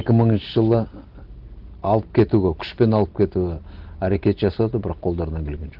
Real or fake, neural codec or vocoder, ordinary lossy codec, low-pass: real; none; Opus, 16 kbps; 5.4 kHz